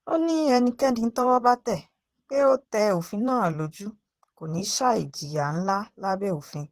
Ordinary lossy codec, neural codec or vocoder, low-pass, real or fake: Opus, 16 kbps; vocoder, 44.1 kHz, 128 mel bands, Pupu-Vocoder; 14.4 kHz; fake